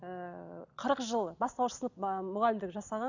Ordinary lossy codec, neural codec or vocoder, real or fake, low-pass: none; none; real; 7.2 kHz